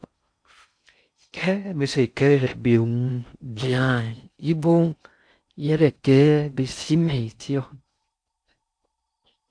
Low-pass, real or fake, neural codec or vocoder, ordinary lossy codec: 9.9 kHz; fake; codec, 16 kHz in and 24 kHz out, 0.6 kbps, FocalCodec, streaming, 4096 codes; AAC, 64 kbps